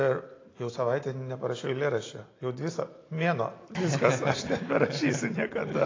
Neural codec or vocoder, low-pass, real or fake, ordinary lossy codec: none; 7.2 kHz; real; AAC, 32 kbps